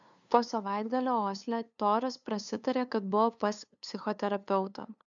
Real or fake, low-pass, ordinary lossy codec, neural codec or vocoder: fake; 7.2 kHz; MP3, 96 kbps; codec, 16 kHz, 2 kbps, FunCodec, trained on LibriTTS, 25 frames a second